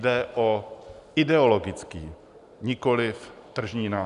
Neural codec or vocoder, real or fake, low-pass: none; real; 10.8 kHz